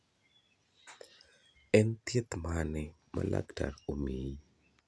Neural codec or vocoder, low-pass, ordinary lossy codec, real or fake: none; none; none; real